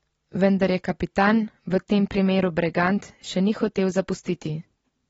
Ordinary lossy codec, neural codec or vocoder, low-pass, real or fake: AAC, 24 kbps; none; 19.8 kHz; real